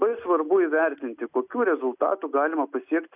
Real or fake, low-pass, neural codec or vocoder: real; 3.6 kHz; none